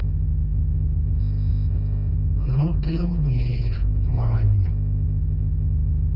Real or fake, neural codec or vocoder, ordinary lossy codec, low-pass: fake; codec, 16 kHz, 2 kbps, FreqCodec, smaller model; none; 5.4 kHz